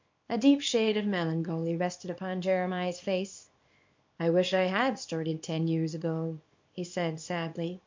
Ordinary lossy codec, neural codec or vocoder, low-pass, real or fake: MP3, 48 kbps; codec, 24 kHz, 0.9 kbps, WavTokenizer, small release; 7.2 kHz; fake